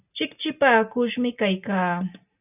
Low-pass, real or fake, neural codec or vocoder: 3.6 kHz; real; none